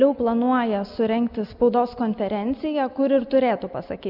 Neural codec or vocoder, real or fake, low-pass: none; real; 5.4 kHz